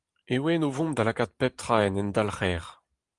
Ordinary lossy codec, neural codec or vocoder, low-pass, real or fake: Opus, 24 kbps; vocoder, 44.1 kHz, 128 mel bands every 512 samples, BigVGAN v2; 10.8 kHz; fake